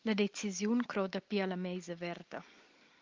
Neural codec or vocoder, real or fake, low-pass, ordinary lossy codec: none; real; 7.2 kHz; Opus, 24 kbps